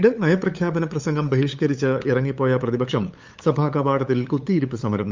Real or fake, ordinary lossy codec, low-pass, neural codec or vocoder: fake; Opus, 32 kbps; 7.2 kHz; codec, 16 kHz, 8 kbps, FunCodec, trained on LibriTTS, 25 frames a second